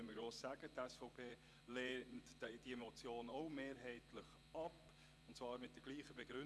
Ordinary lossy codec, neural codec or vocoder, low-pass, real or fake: none; vocoder, 24 kHz, 100 mel bands, Vocos; none; fake